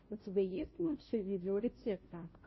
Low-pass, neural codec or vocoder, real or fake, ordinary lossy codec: 7.2 kHz; codec, 16 kHz, 0.5 kbps, FunCodec, trained on Chinese and English, 25 frames a second; fake; MP3, 24 kbps